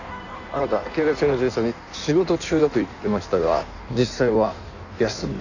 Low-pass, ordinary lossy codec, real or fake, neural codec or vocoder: 7.2 kHz; none; fake; codec, 16 kHz in and 24 kHz out, 1.1 kbps, FireRedTTS-2 codec